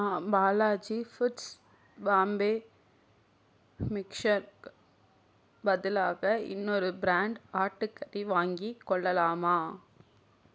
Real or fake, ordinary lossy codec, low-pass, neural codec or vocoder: real; none; none; none